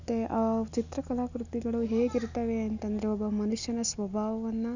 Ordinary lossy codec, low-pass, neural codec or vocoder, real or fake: none; 7.2 kHz; none; real